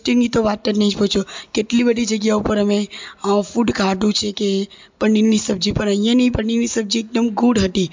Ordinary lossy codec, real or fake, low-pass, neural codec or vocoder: MP3, 64 kbps; real; 7.2 kHz; none